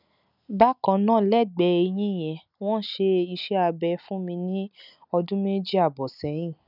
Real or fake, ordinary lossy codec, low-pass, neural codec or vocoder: real; none; 5.4 kHz; none